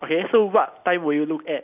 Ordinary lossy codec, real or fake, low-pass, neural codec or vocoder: none; real; 3.6 kHz; none